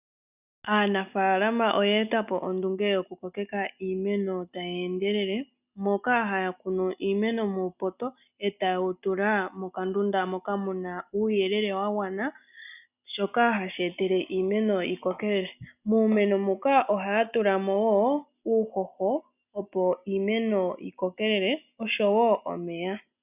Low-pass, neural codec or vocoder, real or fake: 3.6 kHz; none; real